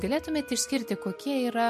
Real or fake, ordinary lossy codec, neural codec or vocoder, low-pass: real; MP3, 64 kbps; none; 14.4 kHz